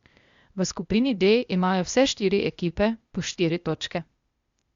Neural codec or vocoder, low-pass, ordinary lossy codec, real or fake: codec, 16 kHz, 0.8 kbps, ZipCodec; 7.2 kHz; Opus, 64 kbps; fake